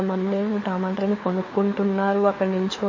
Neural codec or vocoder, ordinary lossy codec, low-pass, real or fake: codec, 16 kHz, 4 kbps, FunCodec, trained on LibriTTS, 50 frames a second; MP3, 32 kbps; 7.2 kHz; fake